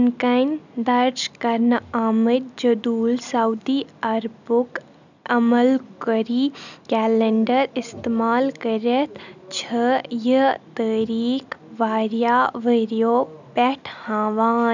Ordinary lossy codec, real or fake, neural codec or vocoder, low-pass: none; real; none; 7.2 kHz